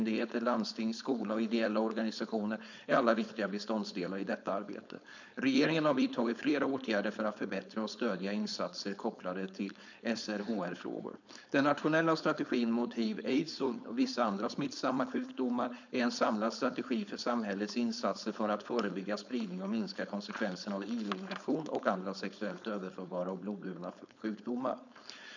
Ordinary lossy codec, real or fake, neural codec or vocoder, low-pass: none; fake; codec, 16 kHz, 4.8 kbps, FACodec; 7.2 kHz